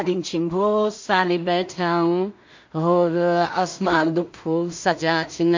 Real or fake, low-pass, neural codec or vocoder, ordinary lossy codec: fake; 7.2 kHz; codec, 16 kHz in and 24 kHz out, 0.4 kbps, LongCat-Audio-Codec, two codebook decoder; MP3, 48 kbps